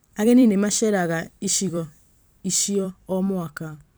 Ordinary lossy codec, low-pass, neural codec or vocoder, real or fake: none; none; vocoder, 44.1 kHz, 128 mel bands every 256 samples, BigVGAN v2; fake